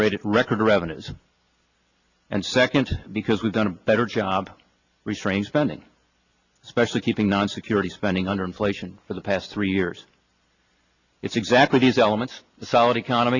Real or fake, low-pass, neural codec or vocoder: real; 7.2 kHz; none